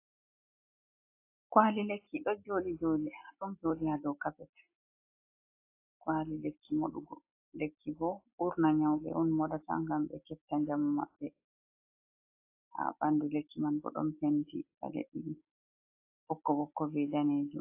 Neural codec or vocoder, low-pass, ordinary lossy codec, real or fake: none; 3.6 kHz; AAC, 24 kbps; real